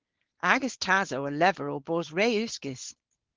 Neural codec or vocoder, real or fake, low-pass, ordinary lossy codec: codec, 16 kHz, 4.8 kbps, FACodec; fake; 7.2 kHz; Opus, 16 kbps